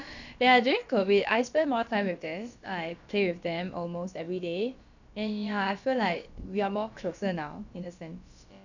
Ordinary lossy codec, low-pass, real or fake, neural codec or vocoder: none; 7.2 kHz; fake; codec, 16 kHz, about 1 kbps, DyCAST, with the encoder's durations